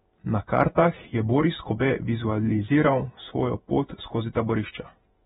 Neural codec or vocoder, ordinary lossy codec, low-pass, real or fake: vocoder, 48 kHz, 128 mel bands, Vocos; AAC, 16 kbps; 19.8 kHz; fake